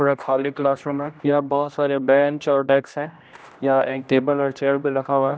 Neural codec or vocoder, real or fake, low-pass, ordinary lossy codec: codec, 16 kHz, 1 kbps, X-Codec, HuBERT features, trained on general audio; fake; none; none